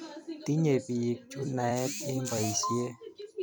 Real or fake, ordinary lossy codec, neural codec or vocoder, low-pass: fake; none; vocoder, 44.1 kHz, 128 mel bands every 256 samples, BigVGAN v2; none